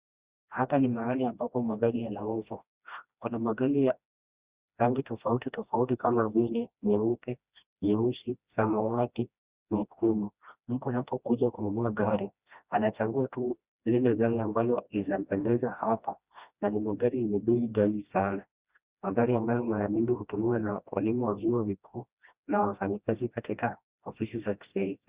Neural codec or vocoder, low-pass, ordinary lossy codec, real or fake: codec, 16 kHz, 1 kbps, FreqCodec, smaller model; 3.6 kHz; Opus, 64 kbps; fake